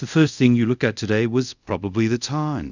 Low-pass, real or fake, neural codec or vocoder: 7.2 kHz; fake; codec, 24 kHz, 0.5 kbps, DualCodec